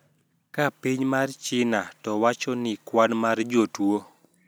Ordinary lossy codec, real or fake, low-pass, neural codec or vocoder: none; real; none; none